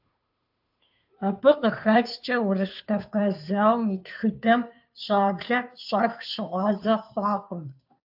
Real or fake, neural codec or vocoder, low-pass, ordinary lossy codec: fake; codec, 16 kHz, 2 kbps, FunCodec, trained on Chinese and English, 25 frames a second; 5.4 kHz; AAC, 48 kbps